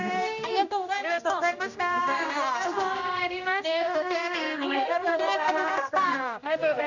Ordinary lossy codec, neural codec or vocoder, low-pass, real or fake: none; codec, 16 kHz, 1 kbps, X-Codec, HuBERT features, trained on general audio; 7.2 kHz; fake